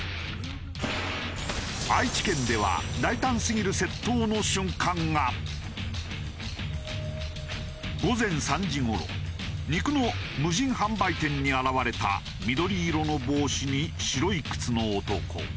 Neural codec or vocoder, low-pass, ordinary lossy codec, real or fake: none; none; none; real